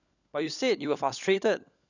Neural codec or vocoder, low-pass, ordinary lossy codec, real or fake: codec, 16 kHz, 16 kbps, FunCodec, trained on LibriTTS, 50 frames a second; 7.2 kHz; none; fake